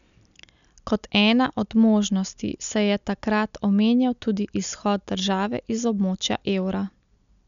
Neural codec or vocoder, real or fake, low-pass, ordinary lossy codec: none; real; 7.2 kHz; none